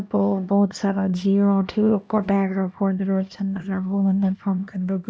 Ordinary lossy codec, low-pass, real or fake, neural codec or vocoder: none; none; fake; codec, 16 kHz, 1 kbps, X-Codec, HuBERT features, trained on LibriSpeech